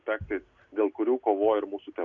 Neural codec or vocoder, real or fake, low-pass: none; real; 7.2 kHz